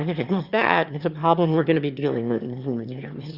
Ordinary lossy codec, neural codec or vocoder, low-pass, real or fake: Opus, 64 kbps; autoencoder, 22.05 kHz, a latent of 192 numbers a frame, VITS, trained on one speaker; 5.4 kHz; fake